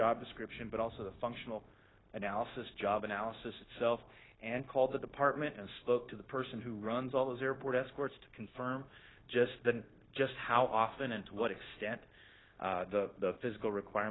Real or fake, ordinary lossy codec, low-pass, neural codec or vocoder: fake; AAC, 16 kbps; 7.2 kHz; codec, 16 kHz, 0.9 kbps, LongCat-Audio-Codec